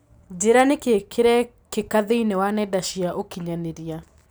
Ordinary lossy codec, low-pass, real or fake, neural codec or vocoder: none; none; real; none